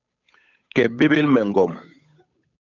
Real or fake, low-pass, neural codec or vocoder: fake; 7.2 kHz; codec, 16 kHz, 8 kbps, FunCodec, trained on Chinese and English, 25 frames a second